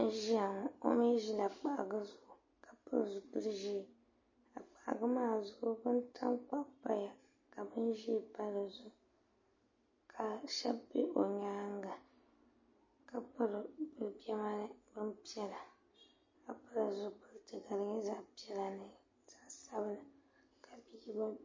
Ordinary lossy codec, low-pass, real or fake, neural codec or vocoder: MP3, 32 kbps; 7.2 kHz; real; none